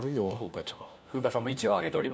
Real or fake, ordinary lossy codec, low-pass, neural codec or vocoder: fake; none; none; codec, 16 kHz, 1 kbps, FunCodec, trained on LibriTTS, 50 frames a second